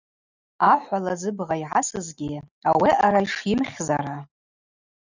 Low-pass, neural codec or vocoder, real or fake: 7.2 kHz; none; real